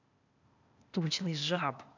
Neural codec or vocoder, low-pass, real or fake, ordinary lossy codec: codec, 16 kHz, 0.8 kbps, ZipCodec; 7.2 kHz; fake; none